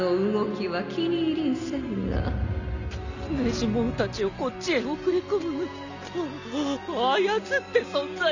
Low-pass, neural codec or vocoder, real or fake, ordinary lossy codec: 7.2 kHz; none; real; none